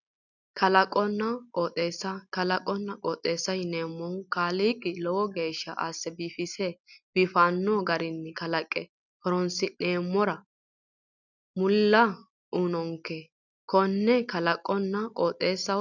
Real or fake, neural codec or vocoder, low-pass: real; none; 7.2 kHz